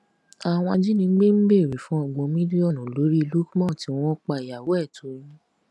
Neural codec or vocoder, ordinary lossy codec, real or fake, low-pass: none; none; real; none